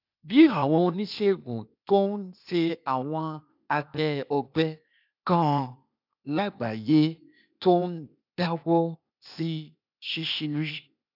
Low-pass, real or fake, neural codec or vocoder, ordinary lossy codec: 5.4 kHz; fake; codec, 16 kHz, 0.8 kbps, ZipCodec; none